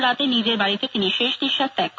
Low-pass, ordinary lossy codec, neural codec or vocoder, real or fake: none; none; none; real